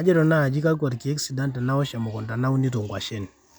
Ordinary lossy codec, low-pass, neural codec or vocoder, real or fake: none; none; none; real